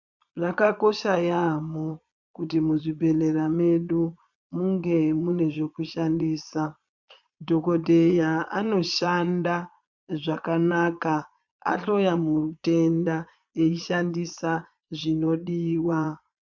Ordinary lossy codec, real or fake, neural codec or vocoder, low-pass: MP3, 64 kbps; fake; vocoder, 22.05 kHz, 80 mel bands, Vocos; 7.2 kHz